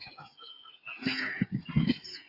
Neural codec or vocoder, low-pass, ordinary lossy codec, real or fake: codec, 16 kHz, 8 kbps, FreqCodec, smaller model; 5.4 kHz; AAC, 48 kbps; fake